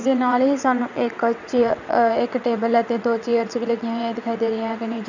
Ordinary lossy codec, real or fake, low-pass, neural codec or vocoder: none; fake; 7.2 kHz; vocoder, 22.05 kHz, 80 mel bands, WaveNeXt